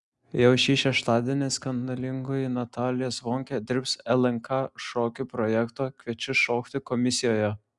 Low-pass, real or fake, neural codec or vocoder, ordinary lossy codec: 10.8 kHz; real; none; Opus, 64 kbps